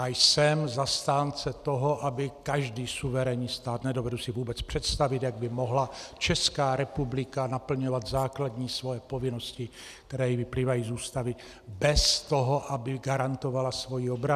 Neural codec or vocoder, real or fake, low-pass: none; real; 14.4 kHz